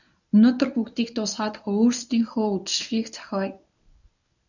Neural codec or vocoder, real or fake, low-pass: codec, 24 kHz, 0.9 kbps, WavTokenizer, medium speech release version 1; fake; 7.2 kHz